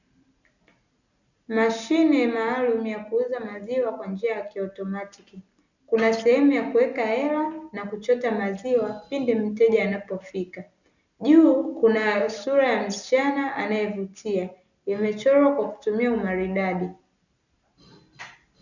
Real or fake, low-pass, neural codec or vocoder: real; 7.2 kHz; none